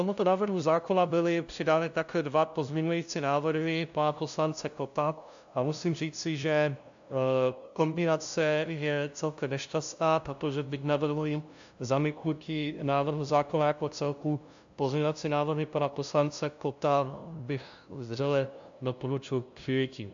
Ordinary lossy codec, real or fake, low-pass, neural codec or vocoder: AAC, 64 kbps; fake; 7.2 kHz; codec, 16 kHz, 0.5 kbps, FunCodec, trained on LibriTTS, 25 frames a second